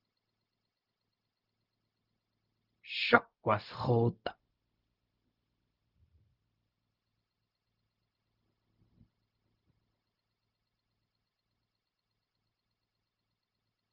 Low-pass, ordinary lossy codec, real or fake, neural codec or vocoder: 5.4 kHz; Opus, 24 kbps; fake; codec, 16 kHz, 0.4 kbps, LongCat-Audio-Codec